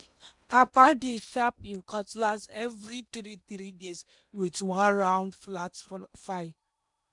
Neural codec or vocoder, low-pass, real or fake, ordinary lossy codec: codec, 16 kHz in and 24 kHz out, 0.8 kbps, FocalCodec, streaming, 65536 codes; 10.8 kHz; fake; none